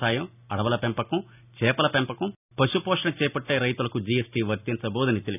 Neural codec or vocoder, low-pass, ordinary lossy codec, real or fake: none; 3.6 kHz; none; real